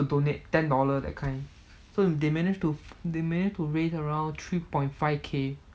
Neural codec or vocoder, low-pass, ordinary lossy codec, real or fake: none; none; none; real